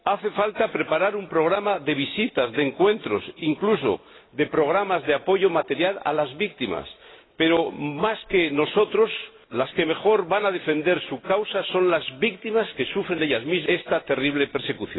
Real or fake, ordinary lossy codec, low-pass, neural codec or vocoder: real; AAC, 16 kbps; 7.2 kHz; none